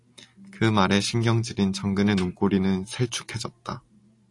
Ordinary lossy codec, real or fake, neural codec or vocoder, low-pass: AAC, 48 kbps; real; none; 10.8 kHz